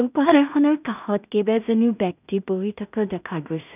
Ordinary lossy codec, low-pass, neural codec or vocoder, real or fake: none; 3.6 kHz; codec, 16 kHz in and 24 kHz out, 0.4 kbps, LongCat-Audio-Codec, two codebook decoder; fake